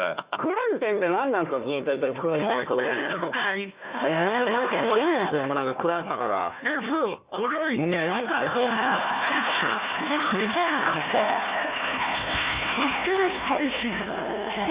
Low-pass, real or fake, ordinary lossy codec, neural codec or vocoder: 3.6 kHz; fake; Opus, 64 kbps; codec, 16 kHz, 1 kbps, FunCodec, trained on Chinese and English, 50 frames a second